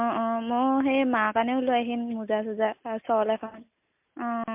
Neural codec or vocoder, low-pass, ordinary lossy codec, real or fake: none; 3.6 kHz; MP3, 32 kbps; real